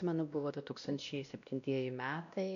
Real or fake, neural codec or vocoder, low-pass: fake; codec, 16 kHz, 1 kbps, X-Codec, WavLM features, trained on Multilingual LibriSpeech; 7.2 kHz